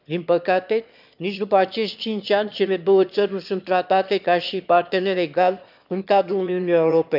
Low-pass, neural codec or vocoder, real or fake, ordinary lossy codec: 5.4 kHz; autoencoder, 22.05 kHz, a latent of 192 numbers a frame, VITS, trained on one speaker; fake; none